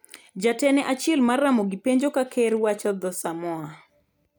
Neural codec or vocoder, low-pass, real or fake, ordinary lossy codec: none; none; real; none